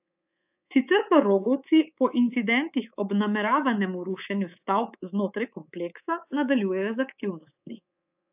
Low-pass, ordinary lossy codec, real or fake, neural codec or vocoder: 3.6 kHz; AAC, 32 kbps; fake; codec, 24 kHz, 3.1 kbps, DualCodec